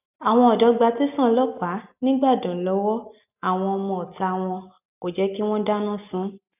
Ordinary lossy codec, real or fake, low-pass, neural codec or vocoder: none; real; 3.6 kHz; none